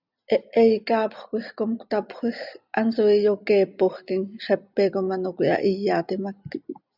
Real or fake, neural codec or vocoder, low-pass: real; none; 5.4 kHz